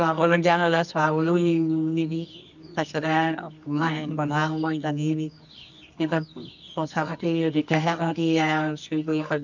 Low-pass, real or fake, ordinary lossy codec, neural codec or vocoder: 7.2 kHz; fake; none; codec, 24 kHz, 0.9 kbps, WavTokenizer, medium music audio release